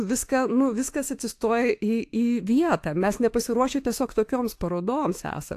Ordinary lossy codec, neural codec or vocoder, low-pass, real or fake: AAC, 64 kbps; autoencoder, 48 kHz, 32 numbers a frame, DAC-VAE, trained on Japanese speech; 14.4 kHz; fake